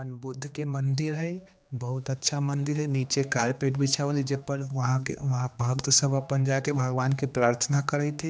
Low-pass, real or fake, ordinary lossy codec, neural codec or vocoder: none; fake; none; codec, 16 kHz, 2 kbps, X-Codec, HuBERT features, trained on general audio